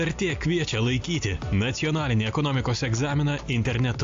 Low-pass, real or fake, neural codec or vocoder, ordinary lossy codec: 7.2 kHz; real; none; AAC, 64 kbps